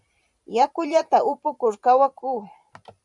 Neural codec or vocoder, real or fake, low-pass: vocoder, 44.1 kHz, 128 mel bands every 512 samples, BigVGAN v2; fake; 10.8 kHz